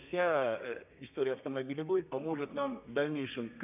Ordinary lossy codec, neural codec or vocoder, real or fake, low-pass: none; codec, 32 kHz, 1.9 kbps, SNAC; fake; 3.6 kHz